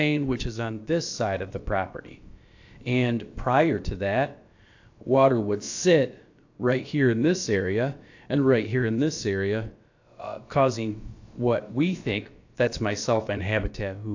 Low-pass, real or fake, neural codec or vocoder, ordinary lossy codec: 7.2 kHz; fake; codec, 16 kHz, about 1 kbps, DyCAST, with the encoder's durations; AAC, 48 kbps